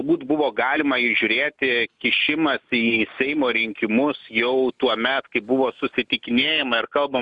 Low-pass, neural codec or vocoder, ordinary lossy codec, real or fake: 10.8 kHz; none; Opus, 64 kbps; real